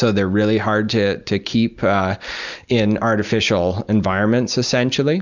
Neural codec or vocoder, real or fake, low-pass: none; real; 7.2 kHz